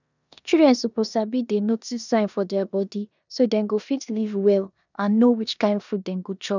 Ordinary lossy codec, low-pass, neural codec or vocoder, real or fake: none; 7.2 kHz; codec, 16 kHz in and 24 kHz out, 0.9 kbps, LongCat-Audio-Codec, four codebook decoder; fake